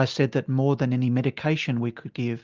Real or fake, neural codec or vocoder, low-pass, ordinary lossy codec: real; none; 7.2 kHz; Opus, 32 kbps